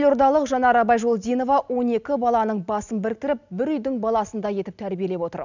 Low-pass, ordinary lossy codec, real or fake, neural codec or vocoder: 7.2 kHz; none; real; none